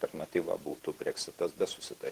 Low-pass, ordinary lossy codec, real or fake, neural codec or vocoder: 14.4 kHz; Opus, 16 kbps; fake; vocoder, 48 kHz, 128 mel bands, Vocos